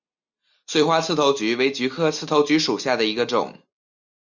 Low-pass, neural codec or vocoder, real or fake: 7.2 kHz; none; real